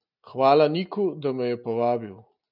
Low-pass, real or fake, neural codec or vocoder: 5.4 kHz; real; none